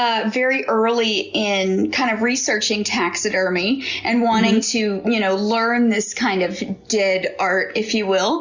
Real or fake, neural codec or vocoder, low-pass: real; none; 7.2 kHz